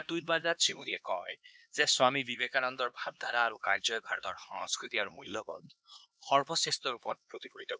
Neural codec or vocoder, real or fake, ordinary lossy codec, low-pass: codec, 16 kHz, 2 kbps, X-Codec, HuBERT features, trained on LibriSpeech; fake; none; none